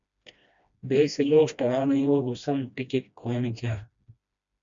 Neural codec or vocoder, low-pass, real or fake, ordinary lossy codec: codec, 16 kHz, 1 kbps, FreqCodec, smaller model; 7.2 kHz; fake; MP3, 64 kbps